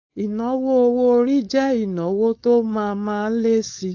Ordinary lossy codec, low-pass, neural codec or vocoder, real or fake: AAC, 48 kbps; 7.2 kHz; codec, 16 kHz, 4.8 kbps, FACodec; fake